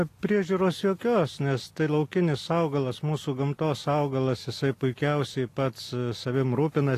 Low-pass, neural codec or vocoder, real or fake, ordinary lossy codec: 14.4 kHz; none; real; AAC, 48 kbps